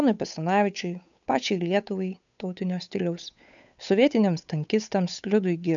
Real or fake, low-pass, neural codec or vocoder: fake; 7.2 kHz; codec, 16 kHz, 8 kbps, FunCodec, trained on Chinese and English, 25 frames a second